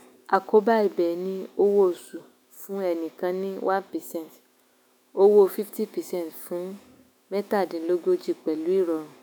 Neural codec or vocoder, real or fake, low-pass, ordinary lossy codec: autoencoder, 48 kHz, 128 numbers a frame, DAC-VAE, trained on Japanese speech; fake; none; none